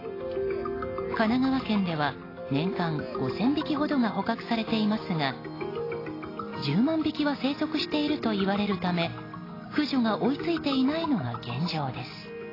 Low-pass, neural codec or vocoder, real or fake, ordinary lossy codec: 5.4 kHz; none; real; AAC, 24 kbps